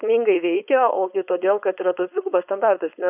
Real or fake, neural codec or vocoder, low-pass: fake; codec, 16 kHz, 4.8 kbps, FACodec; 3.6 kHz